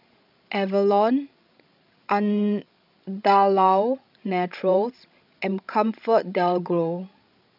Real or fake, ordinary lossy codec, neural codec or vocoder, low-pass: fake; none; codec, 16 kHz, 16 kbps, FreqCodec, larger model; 5.4 kHz